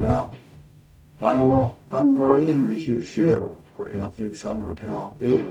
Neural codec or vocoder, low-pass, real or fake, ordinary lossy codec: codec, 44.1 kHz, 0.9 kbps, DAC; 19.8 kHz; fake; none